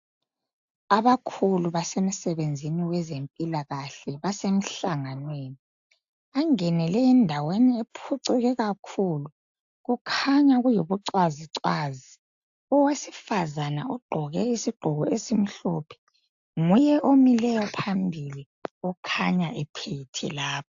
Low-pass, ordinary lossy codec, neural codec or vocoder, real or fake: 7.2 kHz; AAC, 64 kbps; none; real